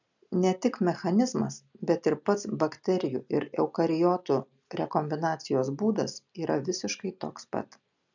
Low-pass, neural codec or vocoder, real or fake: 7.2 kHz; none; real